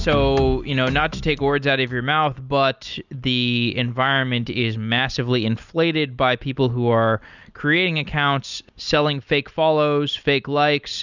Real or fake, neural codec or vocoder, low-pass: real; none; 7.2 kHz